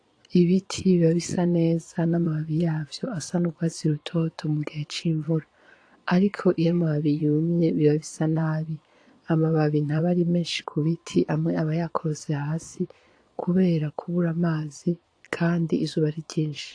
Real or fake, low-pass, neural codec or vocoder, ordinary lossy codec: fake; 9.9 kHz; vocoder, 22.05 kHz, 80 mel bands, Vocos; AAC, 48 kbps